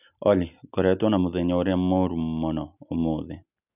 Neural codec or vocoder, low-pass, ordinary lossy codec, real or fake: none; 3.6 kHz; none; real